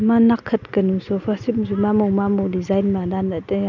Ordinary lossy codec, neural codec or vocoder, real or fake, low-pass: none; none; real; 7.2 kHz